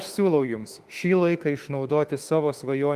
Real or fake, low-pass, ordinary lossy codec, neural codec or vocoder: fake; 14.4 kHz; Opus, 24 kbps; autoencoder, 48 kHz, 32 numbers a frame, DAC-VAE, trained on Japanese speech